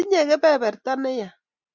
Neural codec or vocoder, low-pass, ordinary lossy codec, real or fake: none; 7.2 kHz; Opus, 64 kbps; real